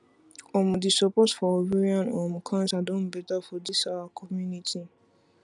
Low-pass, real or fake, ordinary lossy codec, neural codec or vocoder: 10.8 kHz; real; none; none